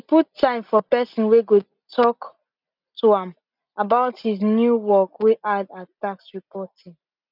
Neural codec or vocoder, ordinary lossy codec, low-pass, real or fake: none; none; 5.4 kHz; real